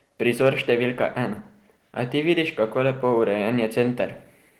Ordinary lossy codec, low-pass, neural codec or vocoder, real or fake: Opus, 16 kbps; 19.8 kHz; none; real